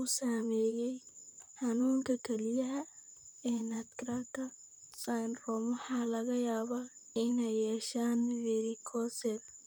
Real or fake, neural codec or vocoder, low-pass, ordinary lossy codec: fake; vocoder, 44.1 kHz, 128 mel bands, Pupu-Vocoder; none; none